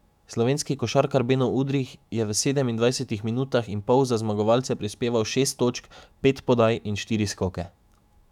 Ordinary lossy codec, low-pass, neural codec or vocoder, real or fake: none; 19.8 kHz; autoencoder, 48 kHz, 128 numbers a frame, DAC-VAE, trained on Japanese speech; fake